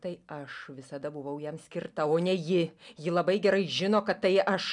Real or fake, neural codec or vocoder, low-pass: real; none; 10.8 kHz